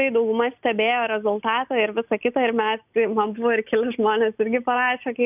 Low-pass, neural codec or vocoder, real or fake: 3.6 kHz; none; real